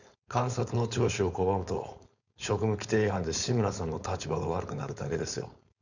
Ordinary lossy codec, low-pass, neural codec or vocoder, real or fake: none; 7.2 kHz; codec, 16 kHz, 4.8 kbps, FACodec; fake